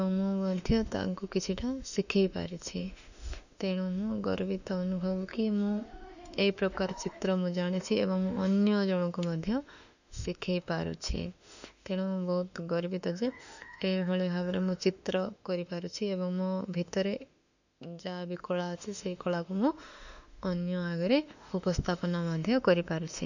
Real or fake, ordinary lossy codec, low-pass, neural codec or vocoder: fake; none; 7.2 kHz; autoencoder, 48 kHz, 32 numbers a frame, DAC-VAE, trained on Japanese speech